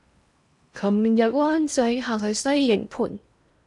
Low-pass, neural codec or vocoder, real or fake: 10.8 kHz; codec, 16 kHz in and 24 kHz out, 0.8 kbps, FocalCodec, streaming, 65536 codes; fake